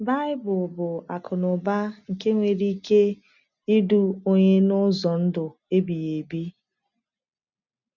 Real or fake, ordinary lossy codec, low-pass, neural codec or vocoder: real; none; 7.2 kHz; none